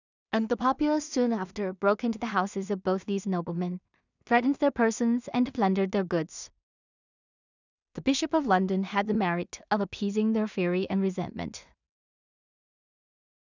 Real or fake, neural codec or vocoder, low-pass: fake; codec, 16 kHz in and 24 kHz out, 0.4 kbps, LongCat-Audio-Codec, two codebook decoder; 7.2 kHz